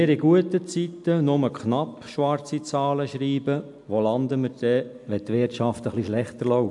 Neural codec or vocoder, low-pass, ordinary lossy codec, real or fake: none; 10.8 kHz; MP3, 64 kbps; real